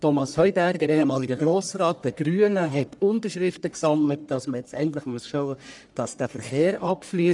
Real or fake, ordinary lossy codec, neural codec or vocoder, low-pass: fake; none; codec, 44.1 kHz, 1.7 kbps, Pupu-Codec; 10.8 kHz